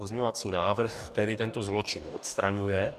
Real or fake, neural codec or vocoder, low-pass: fake; codec, 44.1 kHz, 2.6 kbps, DAC; 14.4 kHz